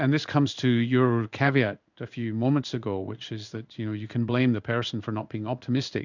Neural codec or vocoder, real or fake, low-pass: codec, 16 kHz in and 24 kHz out, 1 kbps, XY-Tokenizer; fake; 7.2 kHz